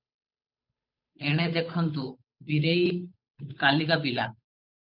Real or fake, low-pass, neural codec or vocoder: fake; 5.4 kHz; codec, 16 kHz, 8 kbps, FunCodec, trained on Chinese and English, 25 frames a second